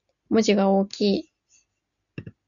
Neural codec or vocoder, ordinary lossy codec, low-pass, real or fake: none; MP3, 96 kbps; 7.2 kHz; real